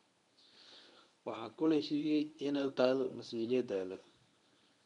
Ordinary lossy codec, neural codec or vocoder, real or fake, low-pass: none; codec, 24 kHz, 0.9 kbps, WavTokenizer, medium speech release version 1; fake; 10.8 kHz